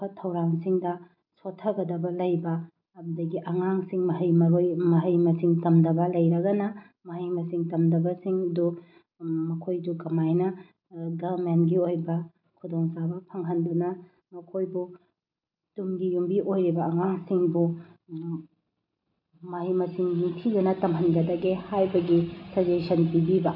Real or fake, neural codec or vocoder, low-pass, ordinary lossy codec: real; none; 5.4 kHz; none